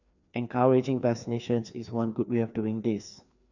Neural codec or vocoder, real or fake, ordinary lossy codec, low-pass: codec, 16 kHz, 2 kbps, FreqCodec, larger model; fake; none; 7.2 kHz